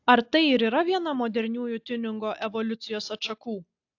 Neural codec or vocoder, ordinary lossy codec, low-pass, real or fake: none; AAC, 48 kbps; 7.2 kHz; real